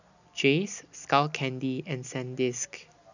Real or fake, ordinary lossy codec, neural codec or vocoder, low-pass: real; none; none; 7.2 kHz